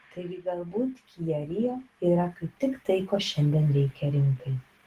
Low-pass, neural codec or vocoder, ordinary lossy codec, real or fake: 14.4 kHz; none; Opus, 16 kbps; real